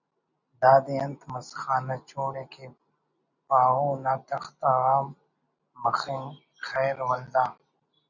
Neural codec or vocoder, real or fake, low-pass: none; real; 7.2 kHz